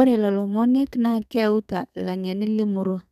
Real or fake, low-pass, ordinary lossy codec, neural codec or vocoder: fake; 14.4 kHz; none; codec, 32 kHz, 1.9 kbps, SNAC